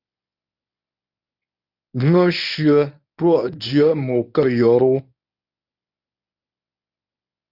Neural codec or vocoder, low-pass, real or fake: codec, 24 kHz, 0.9 kbps, WavTokenizer, medium speech release version 1; 5.4 kHz; fake